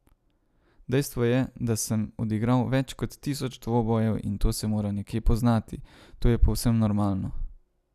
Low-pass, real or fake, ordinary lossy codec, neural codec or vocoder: 14.4 kHz; real; none; none